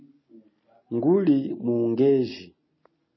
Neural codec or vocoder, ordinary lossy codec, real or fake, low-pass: none; MP3, 24 kbps; real; 7.2 kHz